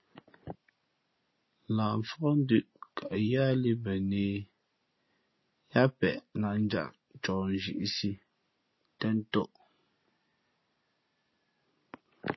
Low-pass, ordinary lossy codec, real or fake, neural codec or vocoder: 7.2 kHz; MP3, 24 kbps; real; none